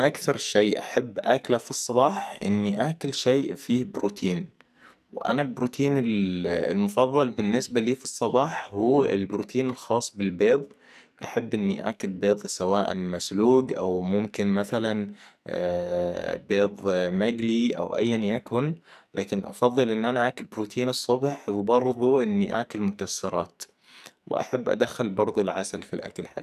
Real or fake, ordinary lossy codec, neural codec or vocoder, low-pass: fake; none; codec, 44.1 kHz, 2.6 kbps, SNAC; 14.4 kHz